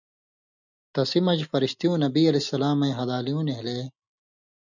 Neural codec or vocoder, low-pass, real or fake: none; 7.2 kHz; real